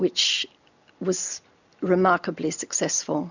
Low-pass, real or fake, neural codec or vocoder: 7.2 kHz; real; none